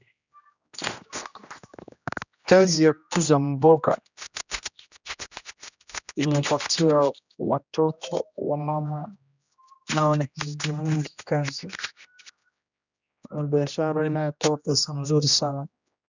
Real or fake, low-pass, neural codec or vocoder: fake; 7.2 kHz; codec, 16 kHz, 1 kbps, X-Codec, HuBERT features, trained on general audio